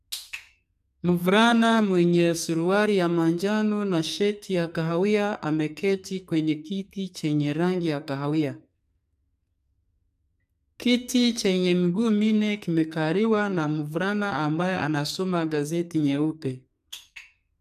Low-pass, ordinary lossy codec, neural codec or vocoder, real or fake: 14.4 kHz; none; codec, 44.1 kHz, 2.6 kbps, SNAC; fake